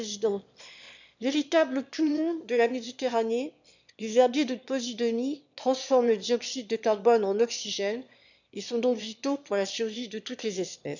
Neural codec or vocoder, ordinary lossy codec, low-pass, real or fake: autoencoder, 22.05 kHz, a latent of 192 numbers a frame, VITS, trained on one speaker; none; 7.2 kHz; fake